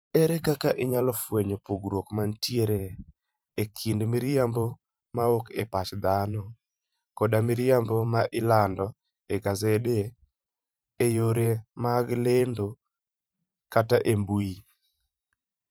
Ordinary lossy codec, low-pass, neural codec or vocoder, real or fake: none; none; vocoder, 44.1 kHz, 128 mel bands every 512 samples, BigVGAN v2; fake